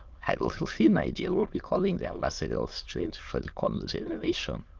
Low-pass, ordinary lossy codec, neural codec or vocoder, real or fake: 7.2 kHz; Opus, 32 kbps; autoencoder, 22.05 kHz, a latent of 192 numbers a frame, VITS, trained on many speakers; fake